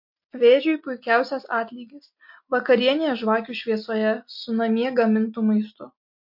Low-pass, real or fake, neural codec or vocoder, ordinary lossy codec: 5.4 kHz; real; none; MP3, 32 kbps